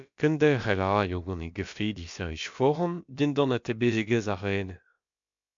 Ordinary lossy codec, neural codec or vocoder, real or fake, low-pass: MP3, 64 kbps; codec, 16 kHz, about 1 kbps, DyCAST, with the encoder's durations; fake; 7.2 kHz